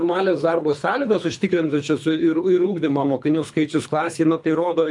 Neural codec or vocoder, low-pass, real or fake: codec, 24 kHz, 3 kbps, HILCodec; 10.8 kHz; fake